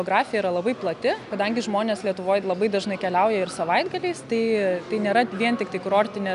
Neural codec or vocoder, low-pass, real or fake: none; 10.8 kHz; real